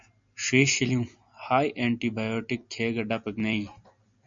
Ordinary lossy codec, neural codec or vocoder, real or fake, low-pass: MP3, 64 kbps; none; real; 7.2 kHz